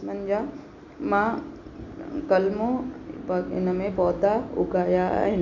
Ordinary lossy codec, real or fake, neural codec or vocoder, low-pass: none; real; none; 7.2 kHz